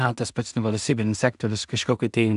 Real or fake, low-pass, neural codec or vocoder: fake; 10.8 kHz; codec, 16 kHz in and 24 kHz out, 0.4 kbps, LongCat-Audio-Codec, two codebook decoder